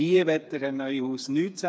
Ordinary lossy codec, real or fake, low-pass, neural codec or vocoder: none; fake; none; codec, 16 kHz, 4 kbps, FreqCodec, smaller model